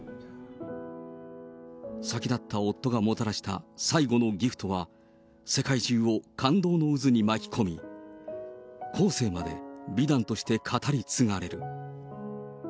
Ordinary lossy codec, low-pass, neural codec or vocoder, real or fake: none; none; none; real